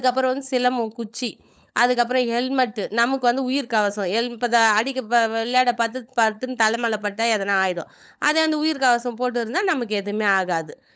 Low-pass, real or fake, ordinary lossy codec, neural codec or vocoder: none; fake; none; codec, 16 kHz, 16 kbps, FunCodec, trained on LibriTTS, 50 frames a second